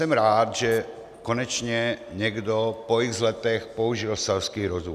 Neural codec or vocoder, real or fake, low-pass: none; real; 14.4 kHz